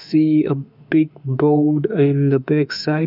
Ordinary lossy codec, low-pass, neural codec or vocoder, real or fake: none; 5.4 kHz; autoencoder, 48 kHz, 32 numbers a frame, DAC-VAE, trained on Japanese speech; fake